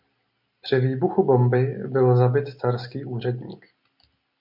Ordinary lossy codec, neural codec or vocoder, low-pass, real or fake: MP3, 48 kbps; none; 5.4 kHz; real